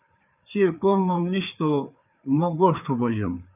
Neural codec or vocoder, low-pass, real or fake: codec, 16 kHz, 4 kbps, FreqCodec, larger model; 3.6 kHz; fake